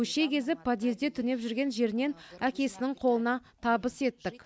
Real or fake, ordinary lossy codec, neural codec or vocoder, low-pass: real; none; none; none